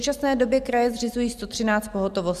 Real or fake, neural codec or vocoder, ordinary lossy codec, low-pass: fake; vocoder, 44.1 kHz, 128 mel bands every 512 samples, BigVGAN v2; Opus, 64 kbps; 14.4 kHz